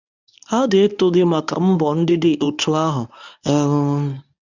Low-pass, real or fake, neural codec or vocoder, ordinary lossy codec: 7.2 kHz; fake; codec, 24 kHz, 0.9 kbps, WavTokenizer, medium speech release version 1; none